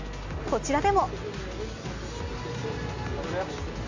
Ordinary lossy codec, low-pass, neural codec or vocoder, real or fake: AAC, 48 kbps; 7.2 kHz; none; real